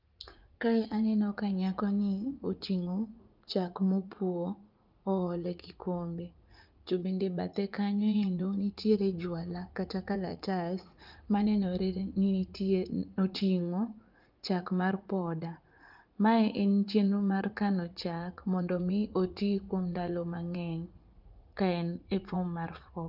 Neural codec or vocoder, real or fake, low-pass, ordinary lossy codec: vocoder, 44.1 kHz, 80 mel bands, Vocos; fake; 5.4 kHz; Opus, 32 kbps